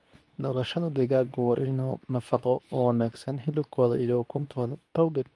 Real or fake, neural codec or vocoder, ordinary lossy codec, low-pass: fake; codec, 24 kHz, 0.9 kbps, WavTokenizer, medium speech release version 2; none; none